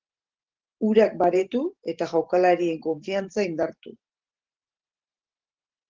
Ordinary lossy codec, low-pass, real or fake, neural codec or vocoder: Opus, 16 kbps; 7.2 kHz; fake; vocoder, 44.1 kHz, 128 mel bands every 512 samples, BigVGAN v2